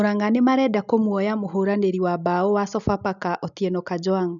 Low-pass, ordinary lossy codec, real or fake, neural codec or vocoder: 7.2 kHz; none; real; none